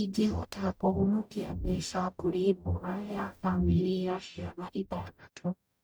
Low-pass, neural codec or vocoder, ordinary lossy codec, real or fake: none; codec, 44.1 kHz, 0.9 kbps, DAC; none; fake